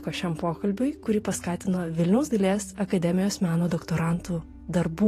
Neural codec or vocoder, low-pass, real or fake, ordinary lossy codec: vocoder, 48 kHz, 128 mel bands, Vocos; 14.4 kHz; fake; AAC, 48 kbps